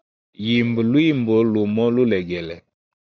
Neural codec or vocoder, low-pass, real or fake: none; 7.2 kHz; real